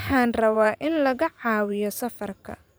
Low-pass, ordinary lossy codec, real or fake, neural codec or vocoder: none; none; fake; vocoder, 44.1 kHz, 128 mel bands every 512 samples, BigVGAN v2